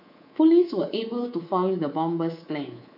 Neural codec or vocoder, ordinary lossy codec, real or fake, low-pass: codec, 24 kHz, 3.1 kbps, DualCodec; none; fake; 5.4 kHz